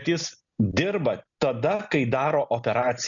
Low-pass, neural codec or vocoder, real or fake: 7.2 kHz; none; real